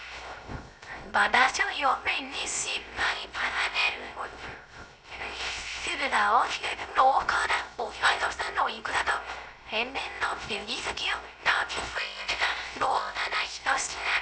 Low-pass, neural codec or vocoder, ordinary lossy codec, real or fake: none; codec, 16 kHz, 0.3 kbps, FocalCodec; none; fake